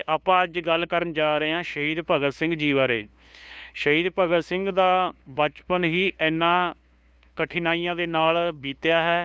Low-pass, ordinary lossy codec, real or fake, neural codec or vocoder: none; none; fake; codec, 16 kHz, 2 kbps, FunCodec, trained on LibriTTS, 25 frames a second